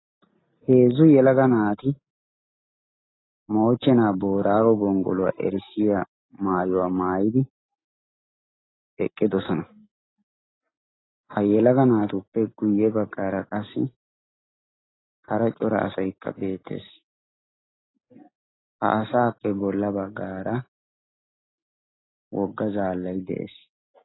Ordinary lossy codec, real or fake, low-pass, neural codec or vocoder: AAC, 16 kbps; real; 7.2 kHz; none